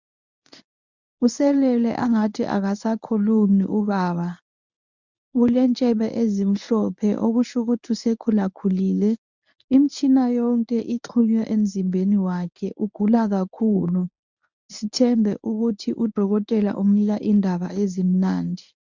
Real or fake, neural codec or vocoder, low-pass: fake; codec, 24 kHz, 0.9 kbps, WavTokenizer, medium speech release version 1; 7.2 kHz